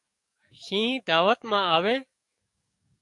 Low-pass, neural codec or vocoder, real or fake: 10.8 kHz; codec, 44.1 kHz, 7.8 kbps, DAC; fake